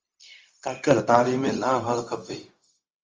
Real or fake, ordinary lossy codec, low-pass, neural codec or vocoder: fake; Opus, 32 kbps; 7.2 kHz; codec, 16 kHz, 0.4 kbps, LongCat-Audio-Codec